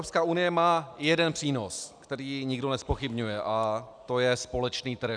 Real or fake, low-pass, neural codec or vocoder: real; 9.9 kHz; none